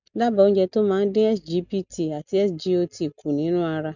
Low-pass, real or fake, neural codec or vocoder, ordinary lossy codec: 7.2 kHz; real; none; none